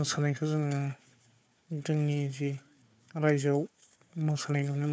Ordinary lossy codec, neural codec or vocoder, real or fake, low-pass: none; codec, 16 kHz, 4 kbps, FunCodec, trained on Chinese and English, 50 frames a second; fake; none